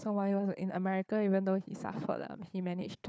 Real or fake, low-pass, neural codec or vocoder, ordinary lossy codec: fake; none; codec, 16 kHz, 4 kbps, FunCodec, trained on Chinese and English, 50 frames a second; none